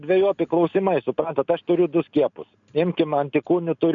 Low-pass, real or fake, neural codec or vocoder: 7.2 kHz; real; none